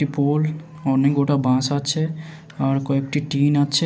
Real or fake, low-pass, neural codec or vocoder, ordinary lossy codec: real; none; none; none